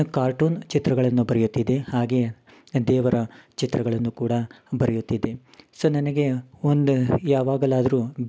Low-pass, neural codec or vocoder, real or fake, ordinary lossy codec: none; none; real; none